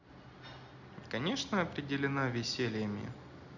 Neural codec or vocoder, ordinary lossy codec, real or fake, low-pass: none; Opus, 64 kbps; real; 7.2 kHz